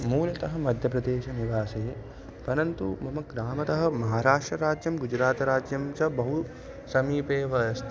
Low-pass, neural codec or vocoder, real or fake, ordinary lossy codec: none; none; real; none